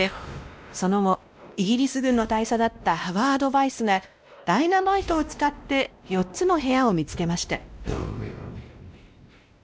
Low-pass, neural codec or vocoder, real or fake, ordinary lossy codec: none; codec, 16 kHz, 1 kbps, X-Codec, WavLM features, trained on Multilingual LibriSpeech; fake; none